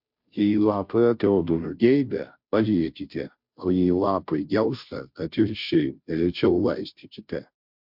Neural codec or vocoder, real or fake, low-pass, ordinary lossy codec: codec, 16 kHz, 0.5 kbps, FunCodec, trained on Chinese and English, 25 frames a second; fake; 5.4 kHz; MP3, 48 kbps